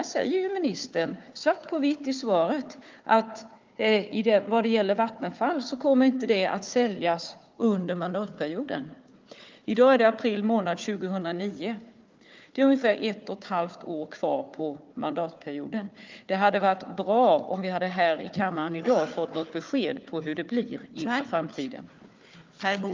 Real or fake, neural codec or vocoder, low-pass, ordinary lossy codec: fake; codec, 16 kHz, 4 kbps, FunCodec, trained on Chinese and English, 50 frames a second; 7.2 kHz; Opus, 24 kbps